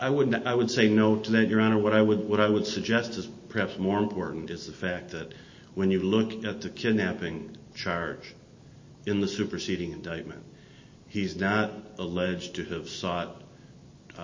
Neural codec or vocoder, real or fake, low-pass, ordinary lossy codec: none; real; 7.2 kHz; MP3, 32 kbps